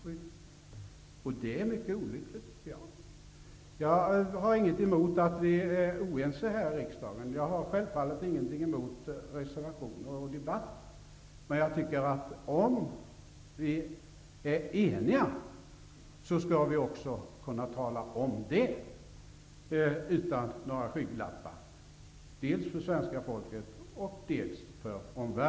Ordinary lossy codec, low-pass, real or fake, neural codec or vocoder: none; none; real; none